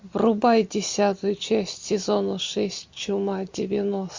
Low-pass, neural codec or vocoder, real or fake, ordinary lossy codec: 7.2 kHz; none; real; MP3, 48 kbps